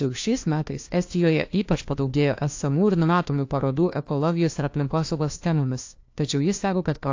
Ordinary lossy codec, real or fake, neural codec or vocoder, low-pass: AAC, 48 kbps; fake; codec, 16 kHz, 1 kbps, FunCodec, trained on LibriTTS, 50 frames a second; 7.2 kHz